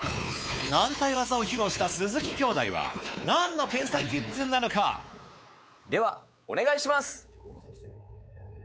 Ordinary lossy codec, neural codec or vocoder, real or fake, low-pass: none; codec, 16 kHz, 4 kbps, X-Codec, WavLM features, trained on Multilingual LibriSpeech; fake; none